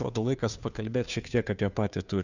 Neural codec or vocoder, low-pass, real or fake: codec, 16 kHz, 2 kbps, FunCodec, trained on Chinese and English, 25 frames a second; 7.2 kHz; fake